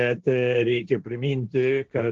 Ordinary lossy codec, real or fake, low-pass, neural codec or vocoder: Opus, 16 kbps; fake; 7.2 kHz; codec, 16 kHz, 1.1 kbps, Voila-Tokenizer